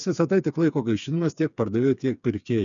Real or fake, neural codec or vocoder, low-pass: fake; codec, 16 kHz, 4 kbps, FreqCodec, smaller model; 7.2 kHz